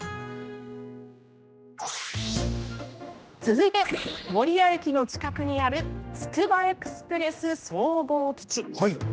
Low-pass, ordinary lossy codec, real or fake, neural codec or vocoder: none; none; fake; codec, 16 kHz, 1 kbps, X-Codec, HuBERT features, trained on general audio